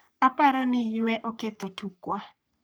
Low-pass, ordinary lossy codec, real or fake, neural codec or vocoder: none; none; fake; codec, 44.1 kHz, 3.4 kbps, Pupu-Codec